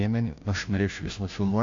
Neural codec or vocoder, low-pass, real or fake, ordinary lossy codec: codec, 16 kHz, 0.5 kbps, FunCodec, trained on Chinese and English, 25 frames a second; 7.2 kHz; fake; AAC, 64 kbps